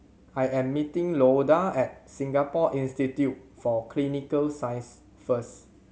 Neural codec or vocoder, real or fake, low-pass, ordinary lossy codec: none; real; none; none